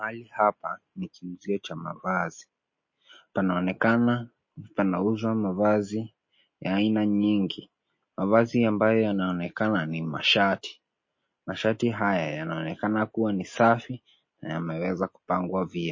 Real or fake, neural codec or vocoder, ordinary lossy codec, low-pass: real; none; MP3, 32 kbps; 7.2 kHz